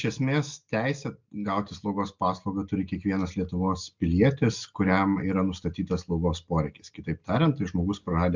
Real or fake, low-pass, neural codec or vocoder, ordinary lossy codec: real; 7.2 kHz; none; MP3, 64 kbps